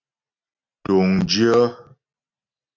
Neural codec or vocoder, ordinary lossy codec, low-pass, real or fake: none; MP3, 48 kbps; 7.2 kHz; real